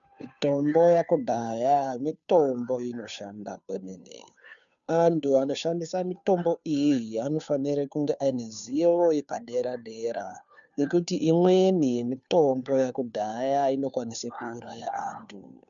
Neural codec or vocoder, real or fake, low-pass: codec, 16 kHz, 2 kbps, FunCodec, trained on Chinese and English, 25 frames a second; fake; 7.2 kHz